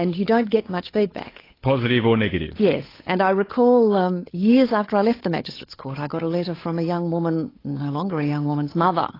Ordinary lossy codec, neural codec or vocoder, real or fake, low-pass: AAC, 24 kbps; codec, 16 kHz, 8 kbps, FunCodec, trained on Chinese and English, 25 frames a second; fake; 5.4 kHz